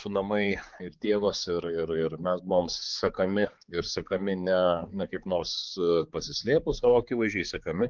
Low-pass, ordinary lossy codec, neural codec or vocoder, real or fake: 7.2 kHz; Opus, 32 kbps; codec, 16 kHz, 4 kbps, X-Codec, HuBERT features, trained on balanced general audio; fake